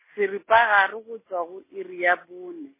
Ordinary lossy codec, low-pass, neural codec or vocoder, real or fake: MP3, 16 kbps; 3.6 kHz; none; real